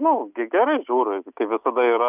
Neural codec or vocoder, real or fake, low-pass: none; real; 3.6 kHz